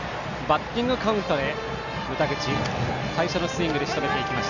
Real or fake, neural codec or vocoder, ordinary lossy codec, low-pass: real; none; none; 7.2 kHz